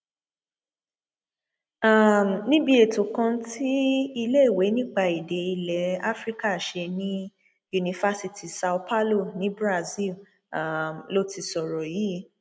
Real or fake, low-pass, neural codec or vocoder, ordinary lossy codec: real; none; none; none